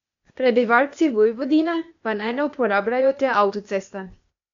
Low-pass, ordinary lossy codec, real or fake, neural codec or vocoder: 7.2 kHz; MP3, 64 kbps; fake; codec, 16 kHz, 0.8 kbps, ZipCodec